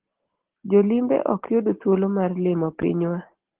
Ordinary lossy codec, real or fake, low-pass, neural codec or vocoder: Opus, 16 kbps; real; 3.6 kHz; none